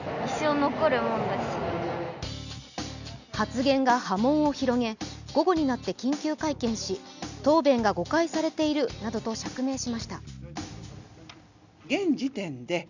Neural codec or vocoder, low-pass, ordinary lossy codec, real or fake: none; 7.2 kHz; none; real